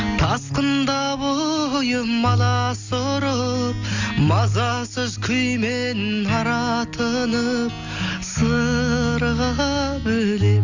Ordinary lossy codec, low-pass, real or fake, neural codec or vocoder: Opus, 64 kbps; 7.2 kHz; real; none